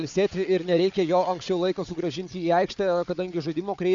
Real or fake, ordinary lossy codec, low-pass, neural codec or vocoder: fake; MP3, 64 kbps; 7.2 kHz; codec, 16 kHz, 4 kbps, FunCodec, trained on Chinese and English, 50 frames a second